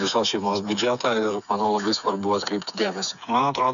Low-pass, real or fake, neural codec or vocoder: 7.2 kHz; fake; codec, 16 kHz, 4 kbps, FreqCodec, smaller model